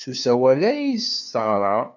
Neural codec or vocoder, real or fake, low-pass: codec, 16 kHz, 2 kbps, FunCodec, trained on LibriTTS, 25 frames a second; fake; 7.2 kHz